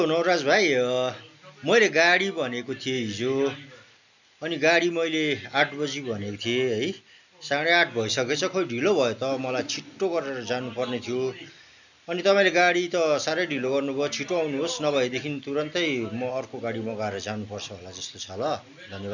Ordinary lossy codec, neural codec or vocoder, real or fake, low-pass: none; none; real; 7.2 kHz